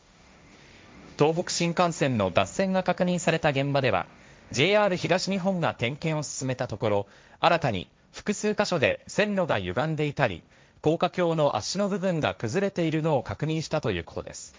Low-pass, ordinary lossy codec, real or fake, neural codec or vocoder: none; none; fake; codec, 16 kHz, 1.1 kbps, Voila-Tokenizer